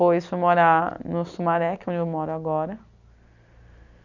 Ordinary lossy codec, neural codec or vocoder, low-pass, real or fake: none; none; 7.2 kHz; real